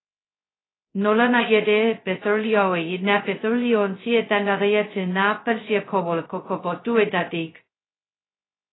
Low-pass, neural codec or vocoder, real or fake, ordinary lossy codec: 7.2 kHz; codec, 16 kHz, 0.2 kbps, FocalCodec; fake; AAC, 16 kbps